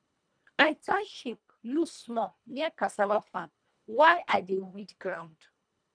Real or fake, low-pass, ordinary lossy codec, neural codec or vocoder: fake; 9.9 kHz; none; codec, 24 kHz, 1.5 kbps, HILCodec